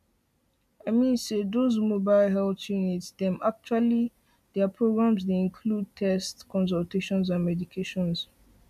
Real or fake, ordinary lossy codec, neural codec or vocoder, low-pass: real; none; none; 14.4 kHz